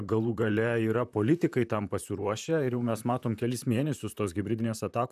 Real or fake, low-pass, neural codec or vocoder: fake; 14.4 kHz; vocoder, 44.1 kHz, 128 mel bands, Pupu-Vocoder